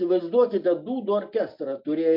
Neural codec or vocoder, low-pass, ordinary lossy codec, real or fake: none; 5.4 kHz; MP3, 48 kbps; real